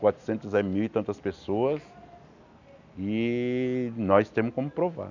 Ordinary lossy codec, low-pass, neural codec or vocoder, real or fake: none; 7.2 kHz; none; real